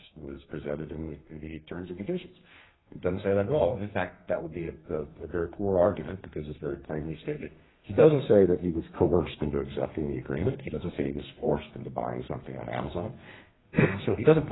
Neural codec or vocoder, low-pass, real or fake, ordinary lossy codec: codec, 32 kHz, 1.9 kbps, SNAC; 7.2 kHz; fake; AAC, 16 kbps